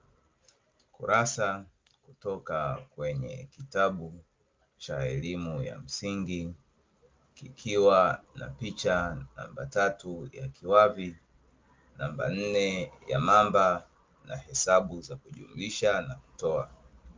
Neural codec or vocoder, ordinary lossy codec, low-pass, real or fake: none; Opus, 32 kbps; 7.2 kHz; real